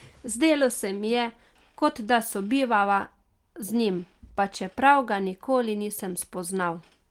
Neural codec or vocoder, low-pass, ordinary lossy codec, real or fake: none; 19.8 kHz; Opus, 24 kbps; real